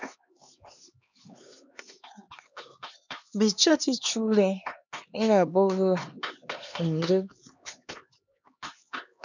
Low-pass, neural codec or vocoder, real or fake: 7.2 kHz; codec, 16 kHz, 2 kbps, X-Codec, HuBERT features, trained on LibriSpeech; fake